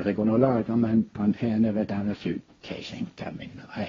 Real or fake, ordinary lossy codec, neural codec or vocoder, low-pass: fake; AAC, 24 kbps; codec, 16 kHz, 1.1 kbps, Voila-Tokenizer; 7.2 kHz